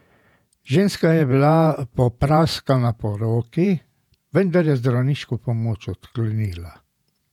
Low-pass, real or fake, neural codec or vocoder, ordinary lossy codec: 19.8 kHz; fake; vocoder, 48 kHz, 128 mel bands, Vocos; none